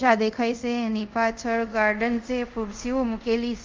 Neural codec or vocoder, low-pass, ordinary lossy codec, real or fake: codec, 24 kHz, 0.5 kbps, DualCodec; 7.2 kHz; Opus, 24 kbps; fake